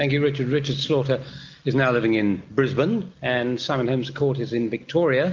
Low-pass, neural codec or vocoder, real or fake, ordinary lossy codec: 7.2 kHz; none; real; Opus, 32 kbps